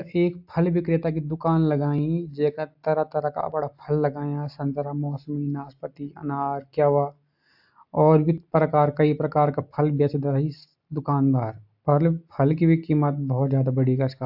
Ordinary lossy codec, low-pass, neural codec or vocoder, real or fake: AAC, 48 kbps; 5.4 kHz; none; real